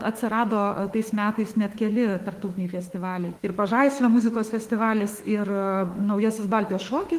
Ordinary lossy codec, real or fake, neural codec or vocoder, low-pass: Opus, 24 kbps; fake; autoencoder, 48 kHz, 32 numbers a frame, DAC-VAE, trained on Japanese speech; 14.4 kHz